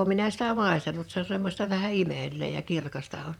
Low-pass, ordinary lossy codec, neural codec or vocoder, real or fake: 19.8 kHz; none; vocoder, 44.1 kHz, 128 mel bands, Pupu-Vocoder; fake